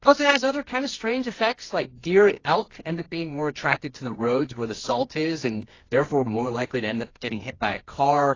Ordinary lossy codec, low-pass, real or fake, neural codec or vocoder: AAC, 32 kbps; 7.2 kHz; fake; codec, 24 kHz, 0.9 kbps, WavTokenizer, medium music audio release